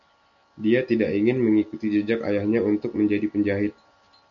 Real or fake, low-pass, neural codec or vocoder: real; 7.2 kHz; none